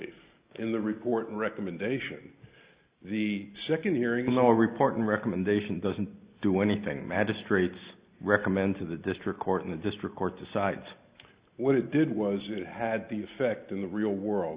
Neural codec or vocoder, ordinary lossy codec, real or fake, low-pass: none; Opus, 64 kbps; real; 3.6 kHz